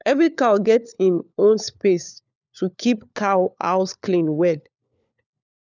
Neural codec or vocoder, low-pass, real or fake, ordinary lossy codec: codec, 16 kHz, 4 kbps, FunCodec, trained on LibriTTS, 50 frames a second; 7.2 kHz; fake; none